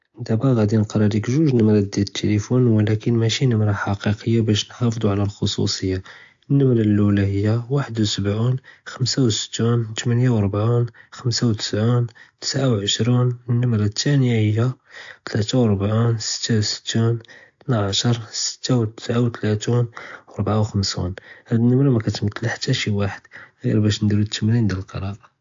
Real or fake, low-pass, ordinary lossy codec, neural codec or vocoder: real; 7.2 kHz; AAC, 64 kbps; none